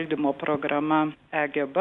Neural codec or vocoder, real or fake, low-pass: none; real; 10.8 kHz